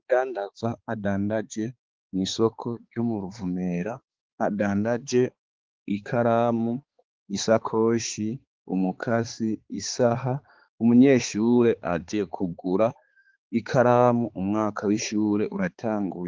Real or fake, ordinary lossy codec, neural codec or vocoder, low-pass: fake; Opus, 16 kbps; codec, 16 kHz, 4 kbps, X-Codec, HuBERT features, trained on balanced general audio; 7.2 kHz